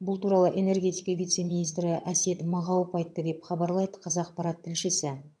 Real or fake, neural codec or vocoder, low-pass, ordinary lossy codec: fake; vocoder, 22.05 kHz, 80 mel bands, HiFi-GAN; none; none